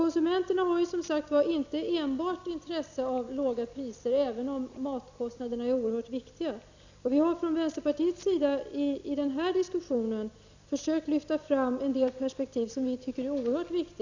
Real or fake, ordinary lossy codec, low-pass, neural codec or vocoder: real; Opus, 64 kbps; 7.2 kHz; none